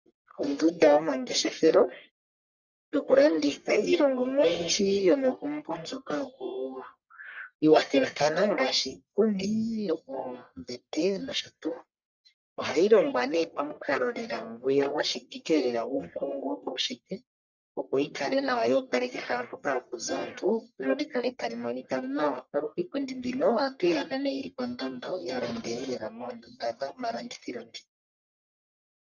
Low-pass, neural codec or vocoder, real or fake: 7.2 kHz; codec, 44.1 kHz, 1.7 kbps, Pupu-Codec; fake